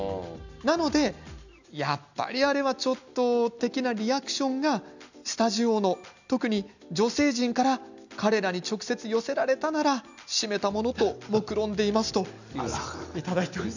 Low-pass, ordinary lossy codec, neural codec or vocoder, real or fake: 7.2 kHz; none; none; real